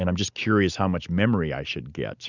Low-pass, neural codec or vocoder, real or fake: 7.2 kHz; none; real